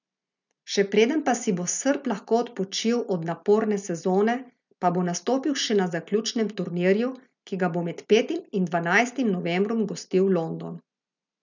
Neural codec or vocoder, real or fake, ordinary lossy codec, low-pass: none; real; none; 7.2 kHz